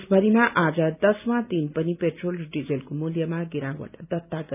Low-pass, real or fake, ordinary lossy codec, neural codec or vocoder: 3.6 kHz; real; none; none